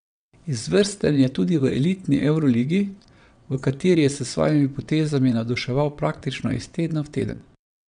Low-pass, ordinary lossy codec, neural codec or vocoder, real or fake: 9.9 kHz; none; vocoder, 22.05 kHz, 80 mel bands, WaveNeXt; fake